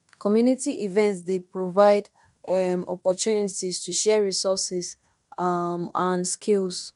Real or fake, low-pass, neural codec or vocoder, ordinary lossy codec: fake; 10.8 kHz; codec, 16 kHz in and 24 kHz out, 0.9 kbps, LongCat-Audio-Codec, fine tuned four codebook decoder; none